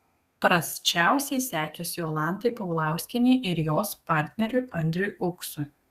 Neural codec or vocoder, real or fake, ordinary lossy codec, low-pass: codec, 32 kHz, 1.9 kbps, SNAC; fake; Opus, 64 kbps; 14.4 kHz